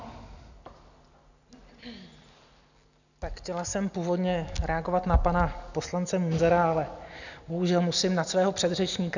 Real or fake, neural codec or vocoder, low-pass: real; none; 7.2 kHz